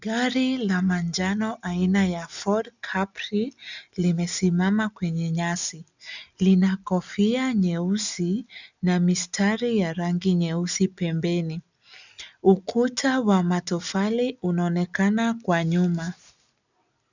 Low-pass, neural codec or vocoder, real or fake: 7.2 kHz; none; real